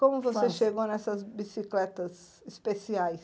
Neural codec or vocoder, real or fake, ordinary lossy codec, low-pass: none; real; none; none